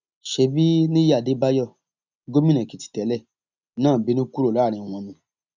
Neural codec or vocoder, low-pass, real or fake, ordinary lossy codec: none; 7.2 kHz; real; none